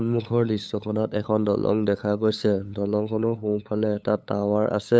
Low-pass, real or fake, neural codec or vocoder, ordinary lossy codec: none; fake; codec, 16 kHz, 8 kbps, FunCodec, trained on LibriTTS, 25 frames a second; none